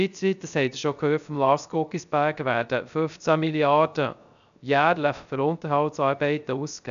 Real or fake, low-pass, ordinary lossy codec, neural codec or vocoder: fake; 7.2 kHz; none; codec, 16 kHz, 0.3 kbps, FocalCodec